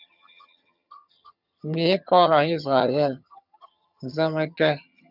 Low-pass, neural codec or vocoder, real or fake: 5.4 kHz; vocoder, 22.05 kHz, 80 mel bands, HiFi-GAN; fake